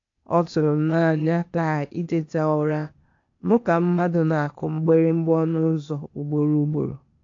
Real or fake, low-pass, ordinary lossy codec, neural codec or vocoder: fake; 7.2 kHz; AAC, 48 kbps; codec, 16 kHz, 0.8 kbps, ZipCodec